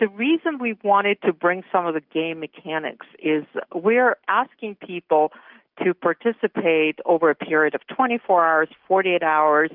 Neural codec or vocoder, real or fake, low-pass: none; real; 5.4 kHz